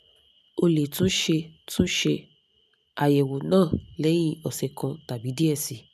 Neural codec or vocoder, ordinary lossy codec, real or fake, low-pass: none; none; real; 14.4 kHz